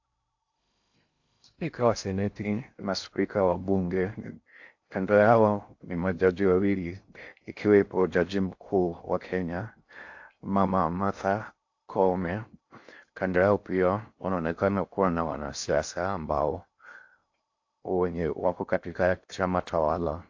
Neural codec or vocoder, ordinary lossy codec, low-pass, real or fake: codec, 16 kHz in and 24 kHz out, 0.6 kbps, FocalCodec, streaming, 2048 codes; AAC, 48 kbps; 7.2 kHz; fake